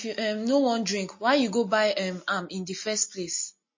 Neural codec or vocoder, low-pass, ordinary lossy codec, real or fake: none; 7.2 kHz; MP3, 32 kbps; real